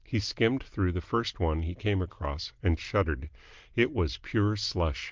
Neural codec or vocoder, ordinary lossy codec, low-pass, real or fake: none; Opus, 24 kbps; 7.2 kHz; real